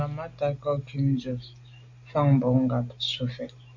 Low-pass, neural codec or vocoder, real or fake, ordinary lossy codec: 7.2 kHz; none; real; AAC, 48 kbps